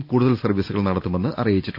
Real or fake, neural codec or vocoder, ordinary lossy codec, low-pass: real; none; none; 5.4 kHz